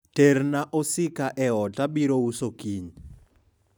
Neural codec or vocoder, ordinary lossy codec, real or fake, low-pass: none; none; real; none